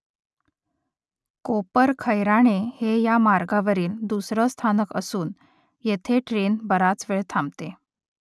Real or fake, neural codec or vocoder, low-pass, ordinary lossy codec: real; none; none; none